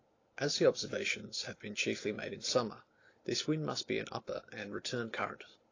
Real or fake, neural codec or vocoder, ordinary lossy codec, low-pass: real; none; AAC, 32 kbps; 7.2 kHz